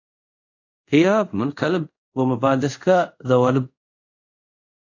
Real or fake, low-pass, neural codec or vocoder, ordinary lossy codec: fake; 7.2 kHz; codec, 24 kHz, 0.5 kbps, DualCodec; AAC, 48 kbps